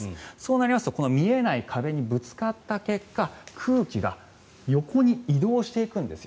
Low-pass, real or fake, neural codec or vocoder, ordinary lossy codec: none; real; none; none